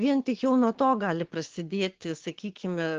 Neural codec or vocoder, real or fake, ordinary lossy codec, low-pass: codec, 16 kHz, 6 kbps, DAC; fake; Opus, 16 kbps; 7.2 kHz